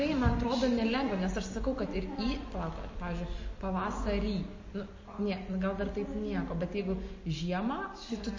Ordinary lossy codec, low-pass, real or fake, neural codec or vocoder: MP3, 32 kbps; 7.2 kHz; real; none